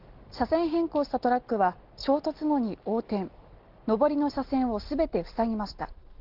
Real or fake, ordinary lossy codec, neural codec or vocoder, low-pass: real; Opus, 16 kbps; none; 5.4 kHz